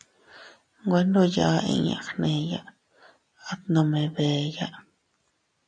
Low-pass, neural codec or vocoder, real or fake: 9.9 kHz; none; real